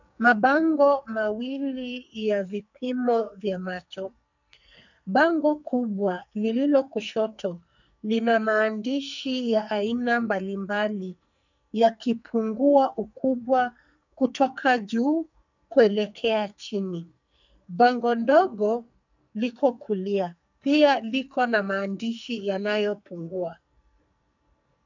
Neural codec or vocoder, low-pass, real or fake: codec, 44.1 kHz, 2.6 kbps, SNAC; 7.2 kHz; fake